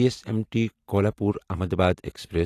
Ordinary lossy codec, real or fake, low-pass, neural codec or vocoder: AAC, 48 kbps; real; 14.4 kHz; none